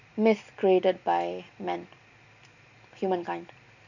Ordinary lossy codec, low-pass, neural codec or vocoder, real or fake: none; 7.2 kHz; none; real